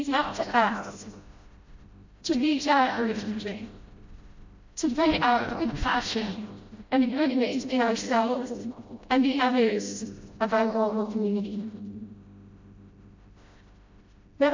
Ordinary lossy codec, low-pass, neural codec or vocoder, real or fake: MP3, 48 kbps; 7.2 kHz; codec, 16 kHz, 0.5 kbps, FreqCodec, smaller model; fake